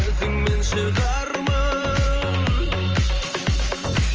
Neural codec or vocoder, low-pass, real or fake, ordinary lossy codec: vocoder, 44.1 kHz, 128 mel bands every 512 samples, BigVGAN v2; 7.2 kHz; fake; Opus, 24 kbps